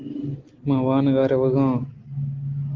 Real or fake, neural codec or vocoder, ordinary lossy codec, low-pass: real; none; Opus, 16 kbps; 7.2 kHz